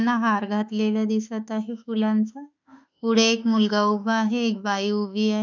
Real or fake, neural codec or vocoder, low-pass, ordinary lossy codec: fake; autoencoder, 48 kHz, 32 numbers a frame, DAC-VAE, trained on Japanese speech; 7.2 kHz; none